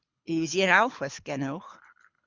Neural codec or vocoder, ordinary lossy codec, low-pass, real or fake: codec, 24 kHz, 6 kbps, HILCodec; Opus, 64 kbps; 7.2 kHz; fake